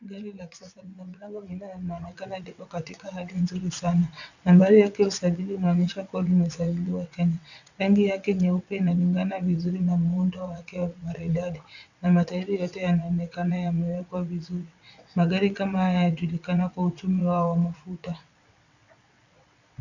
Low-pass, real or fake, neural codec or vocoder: 7.2 kHz; fake; vocoder, 22.05 kHz, 80 mel bands, WaveNeXt